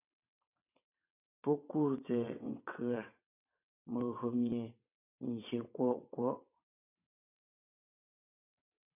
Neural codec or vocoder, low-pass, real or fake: vocoder, 22.05 kHz, 80 mel bands, WaveNeXt; 3.6 kHz; fake